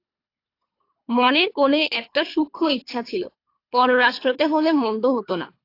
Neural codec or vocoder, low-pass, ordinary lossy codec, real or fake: codec, 24 kHz, 3 kbps, HILCodec; 5.4 kHz; AAC, 32 kbps; fake